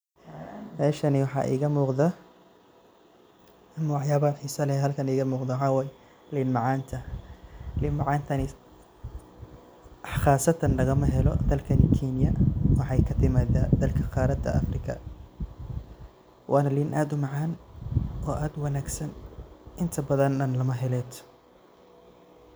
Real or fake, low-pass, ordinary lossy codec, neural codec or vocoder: real; none; none; none